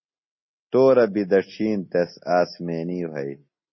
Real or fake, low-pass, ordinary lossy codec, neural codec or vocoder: real; 7.2 kHz; MP3, 24 kbps; none